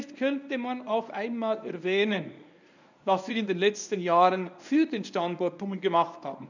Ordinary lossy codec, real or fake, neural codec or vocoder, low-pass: none; fake; codec, 24 kHz, 0.9 kbps, WavTokenizer, medium speech release version 1; 7.2 kHz